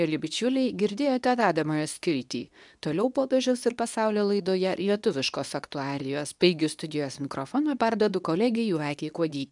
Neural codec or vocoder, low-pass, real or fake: codec, 24 kHz, 0.9 kbps, WavTokenizer, medium speech release version 2; 10.8 kHz; fake